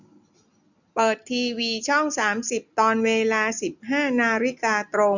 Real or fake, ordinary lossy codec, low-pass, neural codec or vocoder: real; none; 7.2 kHz; none